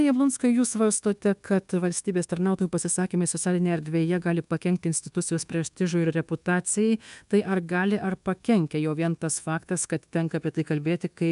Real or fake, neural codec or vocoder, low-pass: fake; codec, 24 kHz, 1.2 kbps, DualCodec; 10.8 kHz